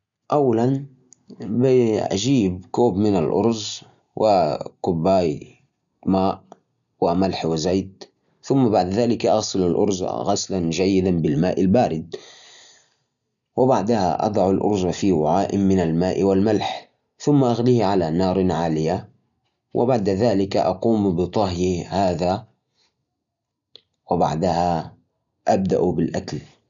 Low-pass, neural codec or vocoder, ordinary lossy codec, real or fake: 7.2 kHz; none; none; real